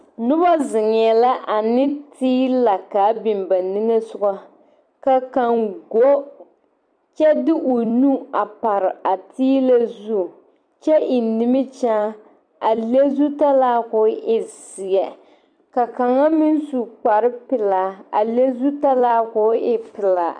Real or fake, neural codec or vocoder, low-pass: fake; vocoder, 44.1 kHz, 128 mel bands every 256 samples, BigVGAN v2; 9.9 kHz